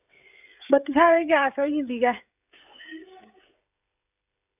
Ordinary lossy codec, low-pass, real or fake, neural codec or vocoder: none; 3.6 kHz; fake; codec, 16 kHz, 16 kbps, FreqCodec, smaller model